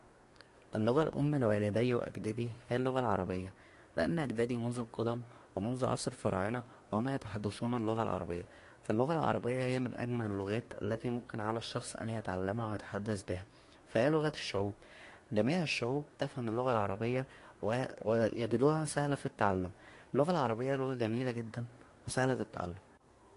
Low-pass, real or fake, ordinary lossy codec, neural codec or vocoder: 10.8 kHz; fake; AAC, 48 kbps; codec, 24 kHz, 1 kbps, SNAC